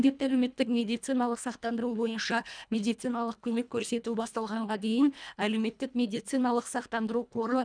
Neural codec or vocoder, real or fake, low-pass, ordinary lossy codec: codec, 24 kHz, 1.5 kbps, HILCodec; fake; 9.9 kHz; none